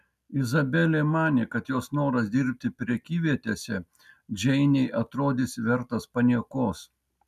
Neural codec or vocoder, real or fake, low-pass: none; real; 14.4 kHz